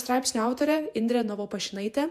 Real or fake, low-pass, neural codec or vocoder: fake; 14.4 kHz; vocoder, 48 kHz, 128 mel bands, Vocos